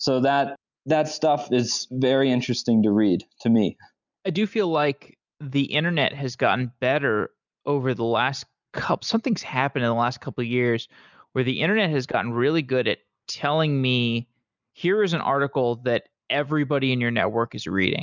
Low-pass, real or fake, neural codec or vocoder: 7.2 kHz; real; none